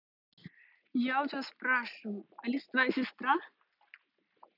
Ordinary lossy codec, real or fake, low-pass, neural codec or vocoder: none; real; 5.4 kHz; none